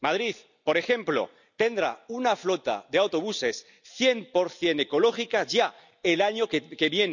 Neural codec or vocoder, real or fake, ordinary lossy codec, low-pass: none; real; none; 7.2 kHz